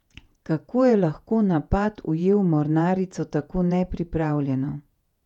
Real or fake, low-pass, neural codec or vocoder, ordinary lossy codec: fake; 19.8 kHz; vocoder, 48 kHz, 128 mel bands, Vocos; none